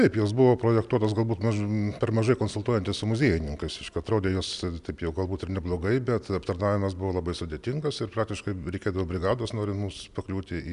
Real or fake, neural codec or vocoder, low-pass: real; none; 10.8 kHz